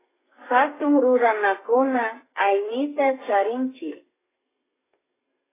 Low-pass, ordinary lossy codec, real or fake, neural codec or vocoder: 3.6 kHz; AAC, 16 kbps; fake; codec, 32 kHz, 1.9 kbps, SNAC